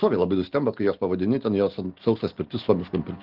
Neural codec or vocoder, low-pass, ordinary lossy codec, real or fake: none; 5.4 kHz; Opus, 16 kbps; real